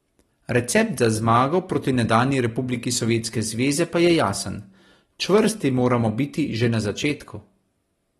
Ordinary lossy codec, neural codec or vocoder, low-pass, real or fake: AAC, 32 kbps; none; 19.8 kHz; real